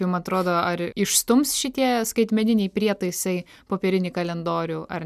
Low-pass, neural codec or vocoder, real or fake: 14.4 kHz; none; real